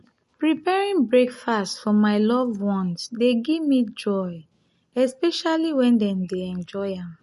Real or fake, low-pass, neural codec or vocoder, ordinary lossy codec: real; 14.4 kHz; none; MP3, 48 kbps